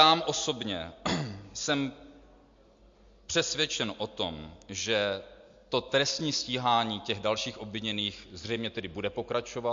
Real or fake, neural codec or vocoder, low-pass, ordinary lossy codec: real; none; 7.2 kHz; MP3, 48 kbps